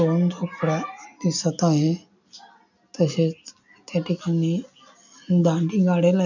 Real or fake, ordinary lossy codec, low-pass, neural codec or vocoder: real; none; 7.2 kHz; none